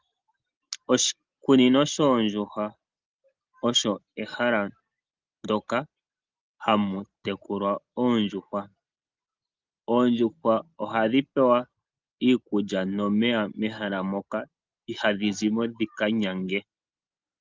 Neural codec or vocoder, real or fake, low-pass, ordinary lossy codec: none; real; 7.2 kHz; Opus, 32 kbps